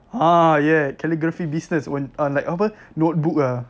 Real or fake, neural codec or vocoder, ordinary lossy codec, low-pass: real; none; none; none